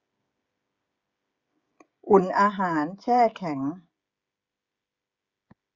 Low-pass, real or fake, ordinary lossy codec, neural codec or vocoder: 7.2 kHz; fake; Opus, 64 kbps; codec, 16 kHz, 16 kbps, FreqCodec, smaller model